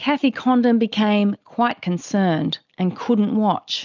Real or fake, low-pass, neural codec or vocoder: real; 7.2 kHz; none